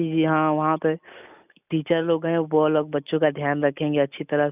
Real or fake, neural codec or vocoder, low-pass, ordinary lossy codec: real; none; 3.6 kHz; none